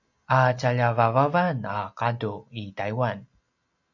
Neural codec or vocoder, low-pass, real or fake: none; 7.2 kHz; real